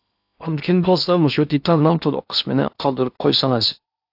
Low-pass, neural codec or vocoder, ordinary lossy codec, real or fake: 5.4 kHz; codec, 16 kHz in and 24 kHz out, 0.8 kbps, FocalCodec, streaming, 65536 codes; AAC, 48 kbps; fake